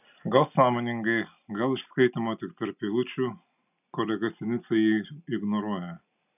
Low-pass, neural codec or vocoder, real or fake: 3.6 kHz; none; real